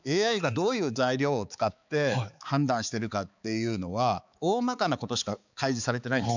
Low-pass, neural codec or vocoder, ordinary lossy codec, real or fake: 7.2 kHz; codec, 16 kHz, 4 kbps, X-Codec, HuBERT features, trained on balanced general audio; none; fake